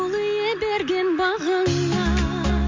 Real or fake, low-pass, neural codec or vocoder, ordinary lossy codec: real; 7.2 kHz; none; MP3, 48 kbps